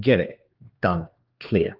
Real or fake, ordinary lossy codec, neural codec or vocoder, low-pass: fake; Opus, 16 kbps; codec, 16 kHz, 2 kbps, X-Codec, HuBERT features, trained on LibriSpeech; 5.4 kHz